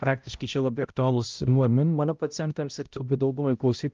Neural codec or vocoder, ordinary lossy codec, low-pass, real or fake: codec, 16 kHz, 0.5 kbps, X-Codec, HuBERT features, trained on balanced general audio; Opus, 32 kbps; 7.2 kHz; fake